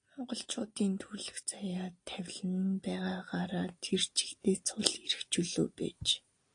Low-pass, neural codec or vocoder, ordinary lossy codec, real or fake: 9.9 kHz; none; AAC, 48 kbps; real